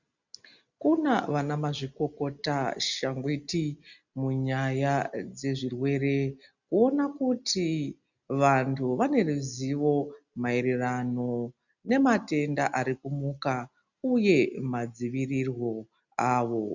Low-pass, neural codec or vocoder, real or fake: 7.2 kHz; none; real